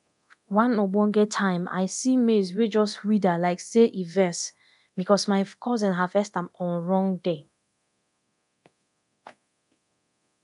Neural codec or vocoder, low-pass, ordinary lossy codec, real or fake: codec, 24 kHz, 0.9 kbps, DualCodec; 10.8 kHz; none; fake